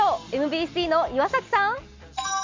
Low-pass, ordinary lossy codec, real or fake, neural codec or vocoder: 7.2 kHz; MP3, 64 kbps; real; none